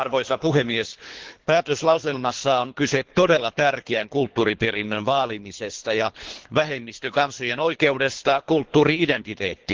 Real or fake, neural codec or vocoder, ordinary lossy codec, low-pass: fake; codec, 24 kHz, 3 kbps, HILCodec; Opus, 16 kbps; 7.2 kHz